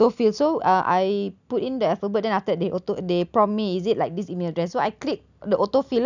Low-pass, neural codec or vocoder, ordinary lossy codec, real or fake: 7.2 kHz; none; none; real